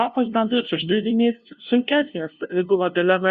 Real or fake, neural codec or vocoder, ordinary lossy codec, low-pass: fake; codec, 16 kHz, 0.5 kbps, FunCodec, trained on LibriTTS, 25 frames a second; Opus, 64 kbps; 7.2 kHz